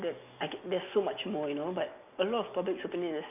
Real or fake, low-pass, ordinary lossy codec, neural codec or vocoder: real; 3.6 kHz; none; none